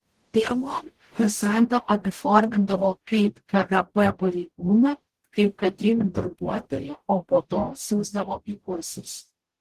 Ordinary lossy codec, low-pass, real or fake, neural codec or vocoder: Opus, 16 kbps; 14.4 kHz; fake; codec, 44.1 kHz, 0.9 kbps, DAC